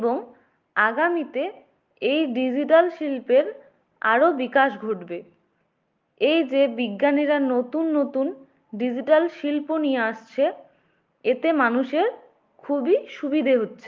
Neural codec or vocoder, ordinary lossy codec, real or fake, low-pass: none; Opus, 32 kbps; real; 7.2 kHz